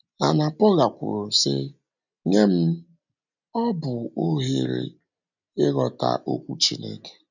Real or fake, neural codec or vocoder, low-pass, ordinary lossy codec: real; none; 7.2 kHz; none